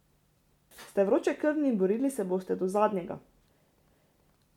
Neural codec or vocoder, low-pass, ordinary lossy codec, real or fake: none; 19.8 kHz; MP3, 96 kbps; real